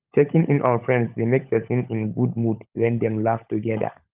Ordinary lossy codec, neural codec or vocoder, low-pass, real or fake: Opus, 24 kbps; codec, 16 kHz, 16 kbps, FunCodec, trained on LibriTTS, 50 frames a second; 3.6 kHz; fake